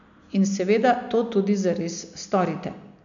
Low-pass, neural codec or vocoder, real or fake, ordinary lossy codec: 7.2 kHz; none; real; none